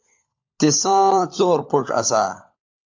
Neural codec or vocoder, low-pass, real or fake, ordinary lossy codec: codec, 16 kHz, 16 kbps, FunCodec, trained on LibriTTS, 50 frames a second; 7.2 kHz; fake; AAC, 48 kbps